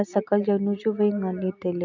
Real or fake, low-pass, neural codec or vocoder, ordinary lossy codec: real; 7.2 kHz; none; none